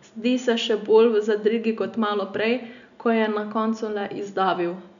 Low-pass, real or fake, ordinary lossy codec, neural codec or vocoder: 7.2 kHz; real; none; none